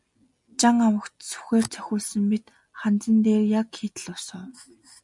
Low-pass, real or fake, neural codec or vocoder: 10.8 kHz; real; none